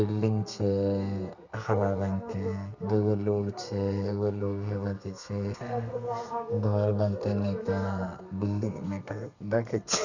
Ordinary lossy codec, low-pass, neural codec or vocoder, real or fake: none; 7.2 kHz; codec, 32 kHz, 1.9 kbps, SNAC; fake